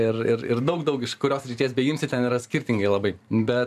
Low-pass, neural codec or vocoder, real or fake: 14.4 kHz; vocoder, 44.1 kHz, 128 mel bands every 512 samples, BigVGAN v2; fake